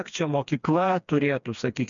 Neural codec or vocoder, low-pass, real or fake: codec, 16 kHz, 2 kbps, FreqCodec, smaller model; 7.2 kHz; fake